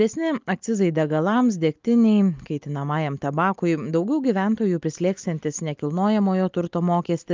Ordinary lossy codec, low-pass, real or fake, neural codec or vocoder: Opus, 24 kbps; 7.2 kHz; real; none